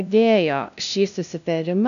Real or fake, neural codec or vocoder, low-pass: fake; codec, 16 kHz, 0.5 kbps, FunCodec, trained on LibriTTS, 25 frames a second; 7.2 kHz